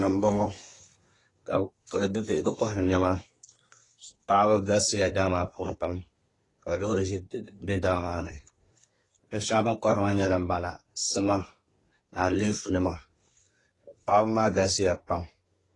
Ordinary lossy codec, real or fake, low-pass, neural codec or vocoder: AAC, 32 kbps; fake; 10.8 kHz; codec, 24 kHz, 1 kbps, SNAC